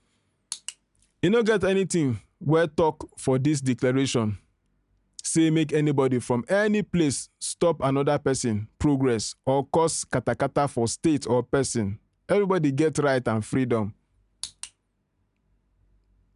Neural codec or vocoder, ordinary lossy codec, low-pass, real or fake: none; none; 10.8 kHz; real